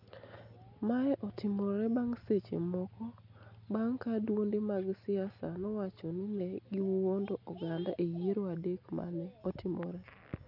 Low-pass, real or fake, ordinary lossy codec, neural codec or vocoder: 5.4 kHz; real; none; none